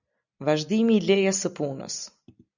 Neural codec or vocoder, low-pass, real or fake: none; 7.2 kHz; real